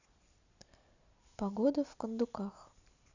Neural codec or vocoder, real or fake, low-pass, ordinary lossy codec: none; real; 7.2 kHz; none